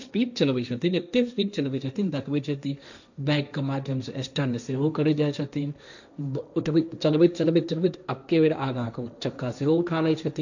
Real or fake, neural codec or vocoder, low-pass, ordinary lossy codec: fake; codec, 16 kHz, 1.1 kbps, Voila-Tokenizer; 7.2 kHz; none